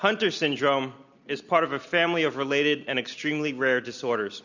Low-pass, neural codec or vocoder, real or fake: 7.2 kHz; none; real